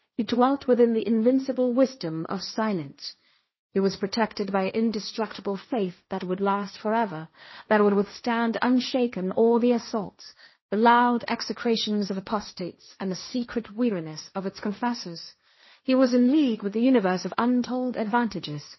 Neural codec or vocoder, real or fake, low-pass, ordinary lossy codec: codec, 16 kHz, 1.1 kbps, Voila-Tokenizer; fake; 7.2 kHz; MP3, 24 kbps